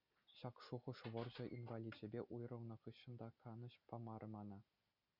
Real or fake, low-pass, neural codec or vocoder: real; 5.4 kHz; none